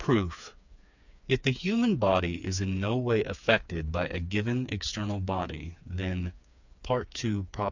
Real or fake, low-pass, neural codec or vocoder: fake; 7.2 kHz; codec, 16 kHz, 4 kbps, FreqCodec, smaller model